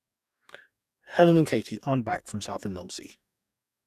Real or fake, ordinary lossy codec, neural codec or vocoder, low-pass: fake; none; codec, 44.1 kHz, 2.6 kbps, DAC; 14.4 kHz